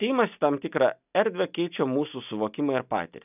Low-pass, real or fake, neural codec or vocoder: 3.6 kHz; real; none